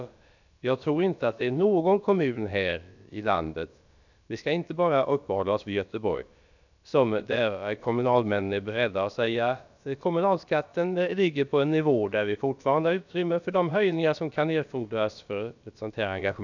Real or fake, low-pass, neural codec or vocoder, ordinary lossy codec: fake; 7.2 kHz; codec, 16 kHz, about 1 kbps, DyCAST, with the encoder's durations; none